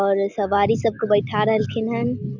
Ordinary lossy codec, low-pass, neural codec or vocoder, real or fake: none; 7.2 kHz; none; real